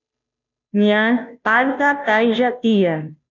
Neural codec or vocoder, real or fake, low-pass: codec, 16 kHz, 0.5 kbps, FunCodec, trained on Chinese and English, 25 frames a second; fake; 7.2 kHz